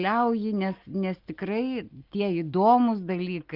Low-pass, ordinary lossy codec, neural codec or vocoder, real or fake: 5.4 kHz; Opus, 32 kbps; none; real